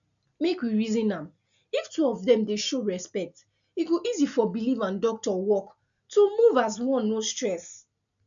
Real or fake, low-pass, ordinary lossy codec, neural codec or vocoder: real; 7.2 kHz; none; none